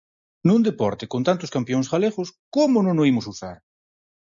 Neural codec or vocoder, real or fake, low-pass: none; real; 7.2 kHz